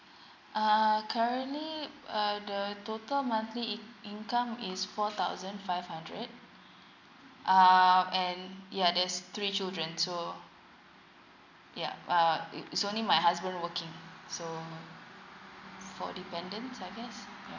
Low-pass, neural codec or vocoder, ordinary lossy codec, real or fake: 7.2 kHz; none; none; real